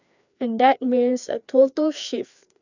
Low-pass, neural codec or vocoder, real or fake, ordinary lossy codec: 7.2 kHz; codec, 16 kHz, 2 kbps, FreqCodec, larger model; fake; none